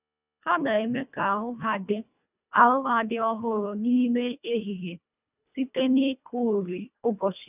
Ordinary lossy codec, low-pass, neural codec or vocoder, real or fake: none; 3.6 kHz; codec, 24 kHz, 1.5 kbps, HILCodec; fake